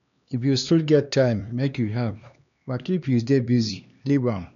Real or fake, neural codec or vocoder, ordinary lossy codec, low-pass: fake; codec, 16 kHz, 2 kbps, X-Codec, HuBERT features, trained on LibriSpeech; none; 7.2 kHz